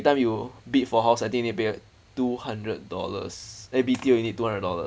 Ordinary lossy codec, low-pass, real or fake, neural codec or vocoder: none; none; real; none